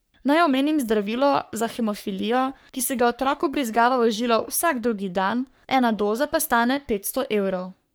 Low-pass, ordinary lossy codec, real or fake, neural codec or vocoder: none; none; fake; codec, 44.1 kHz, 3.4 kbps, Pupu-Codec